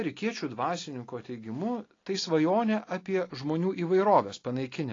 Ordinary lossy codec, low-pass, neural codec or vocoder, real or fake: AAC, 32 kbps; 7.2 kHz; none; real